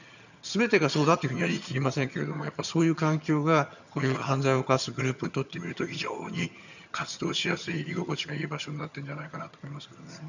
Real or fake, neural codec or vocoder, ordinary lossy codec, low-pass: fake; vocoder, 22.05 kHz, 80 mel bands, HiFi-GAN; none; 7.2 kHz